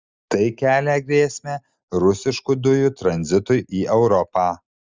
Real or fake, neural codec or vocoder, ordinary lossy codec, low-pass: real; none; Opus, 32 kbps; 7.2 kHz